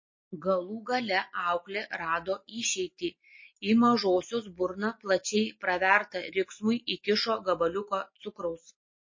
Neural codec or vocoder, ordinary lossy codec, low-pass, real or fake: none; MP3, 32 kbps; 7.2 kHz; real